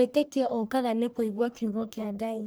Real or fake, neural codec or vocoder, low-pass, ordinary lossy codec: fake; codec, 44.1 kHz, 1.7 kbps, Pupu-Codec; none; none